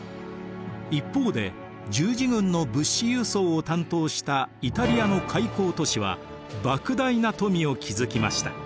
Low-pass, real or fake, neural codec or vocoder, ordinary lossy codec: none; real; none; none